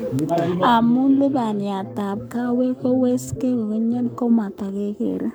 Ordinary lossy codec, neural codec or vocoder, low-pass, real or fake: none; codec, 44.1 kHz, 7.8 kbps, Pupu-Codec; none; fake